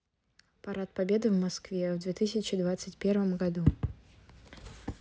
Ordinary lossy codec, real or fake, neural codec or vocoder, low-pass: none; real; none; none